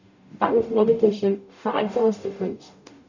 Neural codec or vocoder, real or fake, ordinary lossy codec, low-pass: codec, 44.1 kHz, 0.9 kbps, DAC; fake; none; 7.2 kHz